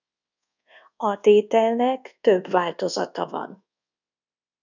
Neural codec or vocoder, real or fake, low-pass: codec, 24 kHz, 1.2 kbps, DualCodec; fake; 7.2 kHz